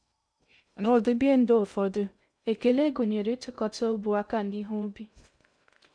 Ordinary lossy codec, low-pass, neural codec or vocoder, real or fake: none; 9.9 kHz; codec, 16 kHz in and 24 kHz out, 0.6 kbps, FocalCodec, streaming, 2048 codes; fake